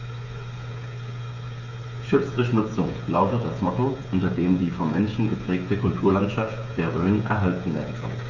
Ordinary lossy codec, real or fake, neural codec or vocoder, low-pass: none; fake; codec, 16 kHz, 8 kbps, FreqCodec, smaller model; 7.2 kHz